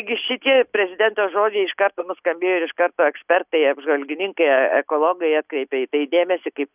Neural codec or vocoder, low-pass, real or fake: none; 3.6 kHz; real